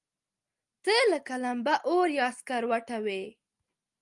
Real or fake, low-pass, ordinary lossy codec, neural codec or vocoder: real; 10.8 kHz; Opus, 32 kbps; none